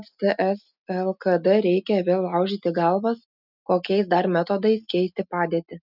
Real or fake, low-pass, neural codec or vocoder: real; 5.4 kHz; none